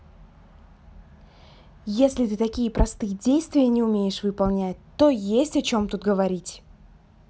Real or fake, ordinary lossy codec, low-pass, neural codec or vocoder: real; none; none; none